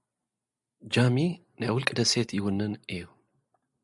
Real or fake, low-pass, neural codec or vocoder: real; 10.8 kHz; none